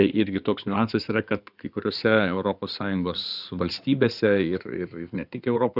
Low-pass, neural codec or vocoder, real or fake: 5.4 kHz; codec, 16 kHz in and 24 kHz out, 2.2 kbps, FireRedTTS-2 codec; fake